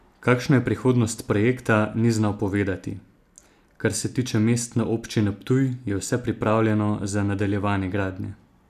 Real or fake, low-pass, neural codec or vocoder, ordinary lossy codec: real; 14.4 kHz; none; none